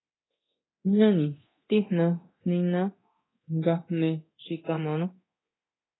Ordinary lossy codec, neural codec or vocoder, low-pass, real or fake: AAC, 16 kbps; codec, 24 kHz, 0.9 kbps, DualCodec; 7.2 kHz; fake